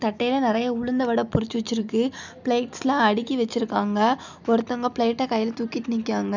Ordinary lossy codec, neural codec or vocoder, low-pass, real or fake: none; none; 7.2 kHz; real